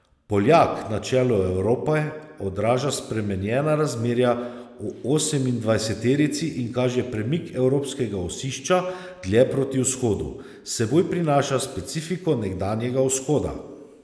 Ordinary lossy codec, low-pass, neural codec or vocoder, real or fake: none; none; none; real